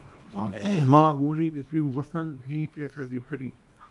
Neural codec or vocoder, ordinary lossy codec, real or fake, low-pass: codec, 24 kHz, 0.9 kbps, WavTokenizer, small release; AAC, 64 kbps; fake; 10.8 kHz